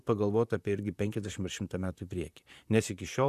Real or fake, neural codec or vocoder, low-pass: fake; vocoder, 44.1 kHz, 128 mel bands, Pupu-Vocoder; 14.4 kHz